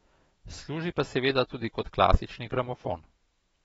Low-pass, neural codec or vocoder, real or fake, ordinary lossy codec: 19.8 kHz; autoencoder, 48 kHz, 128 numbers a frame, DAC-VAE, trained on Japanese speech; fake; AAC, 24 kbps